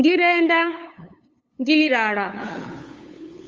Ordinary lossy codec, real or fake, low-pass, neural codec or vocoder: Opus, 32 kbps; fake; 7.2 kHz; codec, 16 kHz, 8 kbps, FunCodec, trained on LibriTTS, 25 frames a second